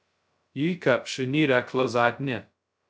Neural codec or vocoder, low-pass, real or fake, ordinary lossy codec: codec, 16 kHz, 0.2 kbps, FocalCodec; none; fake; none